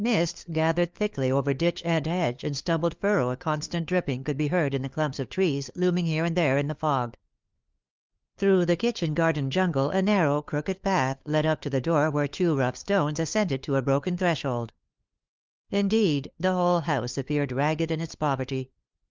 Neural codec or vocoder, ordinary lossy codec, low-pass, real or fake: codec, 16 kHz, 4 kbps, FunCodec, trained on LibriTTS, 50 frames a second; Opus, 24 kbps; 7.2 kHz; fake